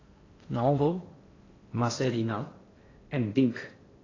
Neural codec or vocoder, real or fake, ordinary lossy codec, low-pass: codec, 16 kHz in and 24 kHz out, 0.6 kbps, FocalCodec, streaming, 4096 codes; fake; AAC, 32 kbps; 7.2 kHz